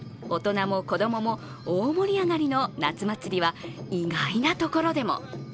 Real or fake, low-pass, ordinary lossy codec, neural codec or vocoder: real; none; none; none